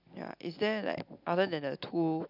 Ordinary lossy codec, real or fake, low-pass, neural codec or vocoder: none; real; 5.4 kHz; none